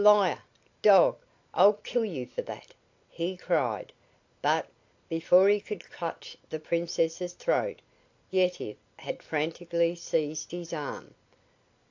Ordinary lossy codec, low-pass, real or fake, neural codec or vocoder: AAC, 48 kbps; 7.2 kHz; real; none